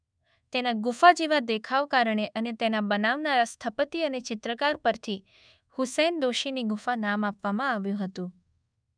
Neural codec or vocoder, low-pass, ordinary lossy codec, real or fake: codec, 24 kHz, 1.2 kbps, DualCodec; 9.9 kHz; none; fake